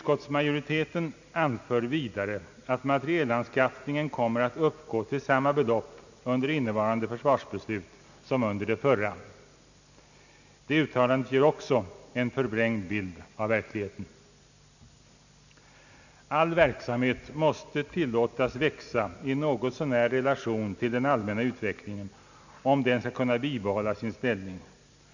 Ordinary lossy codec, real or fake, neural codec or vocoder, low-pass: none; real; none; 7.2 kHz